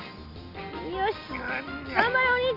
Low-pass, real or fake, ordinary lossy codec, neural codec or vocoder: 5.4 kHz; real; none; none